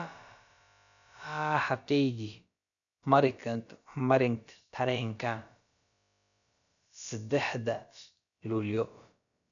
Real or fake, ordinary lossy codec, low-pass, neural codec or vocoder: fake; none; 7.2 kHz; codec, 16 kHz, about 1 kbps, DyCAST, with the encoder's durations